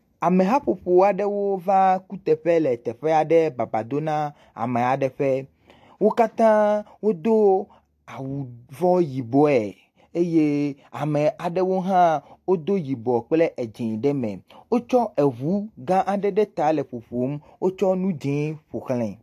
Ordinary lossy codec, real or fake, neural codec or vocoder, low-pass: AAC, 64 kbps; real; none; 14.4 kHz